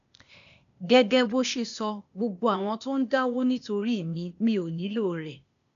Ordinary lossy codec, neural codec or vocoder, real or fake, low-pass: none; codec, 16 kHz, 0.8 kbps, ZipCodec; fake; 7.2 kHz